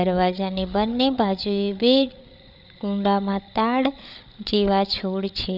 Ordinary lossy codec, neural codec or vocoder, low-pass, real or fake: none; codec, 16 kHz, 8 kbps, FreqCodec, larger model; 5.4 kHz; fake